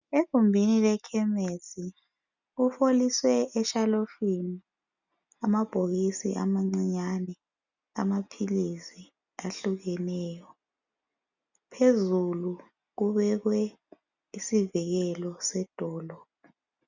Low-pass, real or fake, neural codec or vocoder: 7.2 kHz; real; none